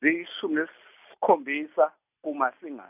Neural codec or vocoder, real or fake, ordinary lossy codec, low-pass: none; real; none; 3.6 kHz